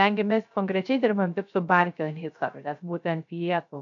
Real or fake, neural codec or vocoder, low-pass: fake; codec, 16 kHz, 0.3 kbps, FocalCodec; 7.2 kHz